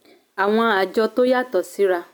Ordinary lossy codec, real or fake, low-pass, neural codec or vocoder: none; real; 19.8 kHz; none